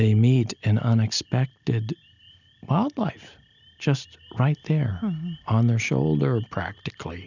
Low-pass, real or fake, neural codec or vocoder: 7.2 kHz; real; none